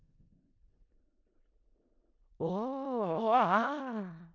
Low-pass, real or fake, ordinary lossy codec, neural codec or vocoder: 7.2 kHz; fake; none; codec, 16 kHz in and 24 kHz out, 0.4 kbps, LongCat-Audio-Codec, four codebook decoder